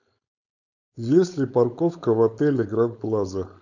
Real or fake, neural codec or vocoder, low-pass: fake; codec, 16 kHz, 4.8 kbps, FACodec; 7.2 kHz